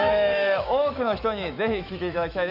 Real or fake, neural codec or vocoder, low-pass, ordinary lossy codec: fake; autoencoder, 48 kHz, 128 numbers a frame, DAC-VAE, trained on Japanese speech; 5.4 kHz; none